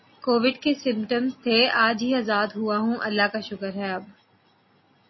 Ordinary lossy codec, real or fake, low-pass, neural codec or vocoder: MP3, 24 kbps; real; 7.2 kHz; none